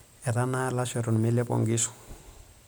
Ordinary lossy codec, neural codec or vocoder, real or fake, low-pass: none; none; real; none